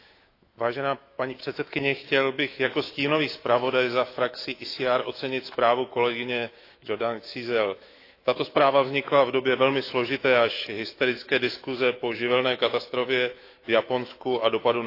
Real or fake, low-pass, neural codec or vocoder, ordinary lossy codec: fake; 5.4 kHz; autoencoder, 48 kHz, 128 numbers a frame, DAC-VAE, trained on Japanese speech; AAC, 32 kbps